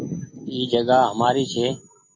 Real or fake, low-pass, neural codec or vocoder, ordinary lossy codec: real; 7.2 kHz; none; MP3, 32 kbps